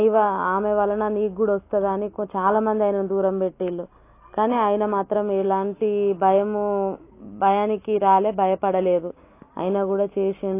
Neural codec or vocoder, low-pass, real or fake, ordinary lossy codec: none; 3.6 kHz; real; AAC, 24 kbps